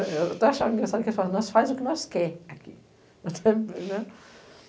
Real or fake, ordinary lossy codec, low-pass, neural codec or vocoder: real; none; none; none